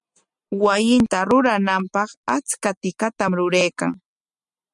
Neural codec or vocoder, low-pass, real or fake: none; 10.8 kHz; real